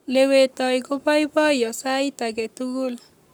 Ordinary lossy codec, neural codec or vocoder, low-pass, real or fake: none; codec, 44.1 kHz, 7.8 kbps, Pupu-Codec; none; fake